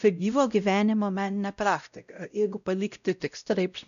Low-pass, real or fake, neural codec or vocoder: 7.2 kHz; fake; codec, 16 kHz, 0.5 kbps, X-Codec, WavLM features, trained on Multilingual LibriSpeech